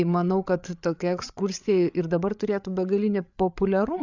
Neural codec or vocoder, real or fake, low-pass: codec, 16 kHz, 8 kbps, FreqCodec, larger model; fake; 7.2 kHz